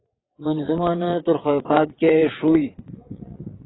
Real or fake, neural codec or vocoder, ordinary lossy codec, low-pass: fake; vocoder, 22.05 kHz, 80 mel bands, WaveNeXt; AAC, 16 kbps; 7.2 kHz